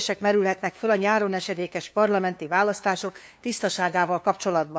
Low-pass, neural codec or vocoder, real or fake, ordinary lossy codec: none; codec, 16 kHz, 2 kbps, FunCodec, trained on LibriTTS, 25 frames a second; fake; none